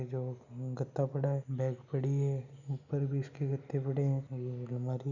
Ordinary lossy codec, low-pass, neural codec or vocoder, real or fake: none; 7.2 kHz; none; real